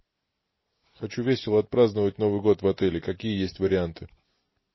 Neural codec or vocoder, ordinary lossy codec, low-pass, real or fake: none; MP3, 24 kbps; 7.2 kHz; real